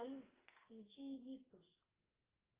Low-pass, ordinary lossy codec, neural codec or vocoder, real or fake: 3.6 kHz; Opus, 32 kbps; codec, 44.1 kHz, 2.6 kbps, SNAC; fake